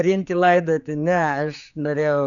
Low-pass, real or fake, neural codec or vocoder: 7.2 kHz; fake; codec, 16 kHz, 4 kbps, X-Codec, HuBERT features, trained on general audio